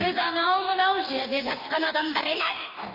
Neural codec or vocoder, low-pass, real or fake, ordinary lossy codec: codec, 44.1 kHz, 2.6 kbps, DAC; 5.4 kHz; fake; none